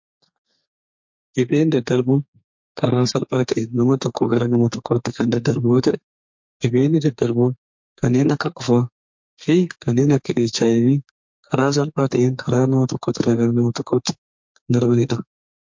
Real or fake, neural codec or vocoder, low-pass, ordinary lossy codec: fake; codec, 32 kHz, 1.9 kbps, SNAC; 7.2 kHz; MP3, 48 kbps